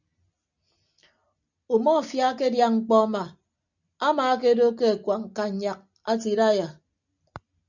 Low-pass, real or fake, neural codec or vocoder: 7.2 kHz; real; none